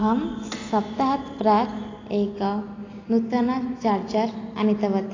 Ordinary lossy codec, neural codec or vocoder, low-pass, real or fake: AAC, 32 kbps; none; 7.2 kHz; real